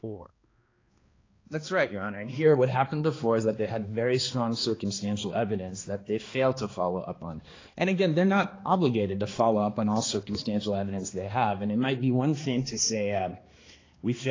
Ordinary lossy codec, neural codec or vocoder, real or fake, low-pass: AAC, 32 kbps; codec, 16 kHz, 2 kbps, X-Codec, HuBERT features, trained on balanced general audio; fake; 7.2 kHz